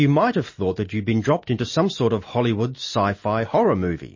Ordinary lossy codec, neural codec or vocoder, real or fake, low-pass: MP3, 32 kbps; none; real; 7.2 kHz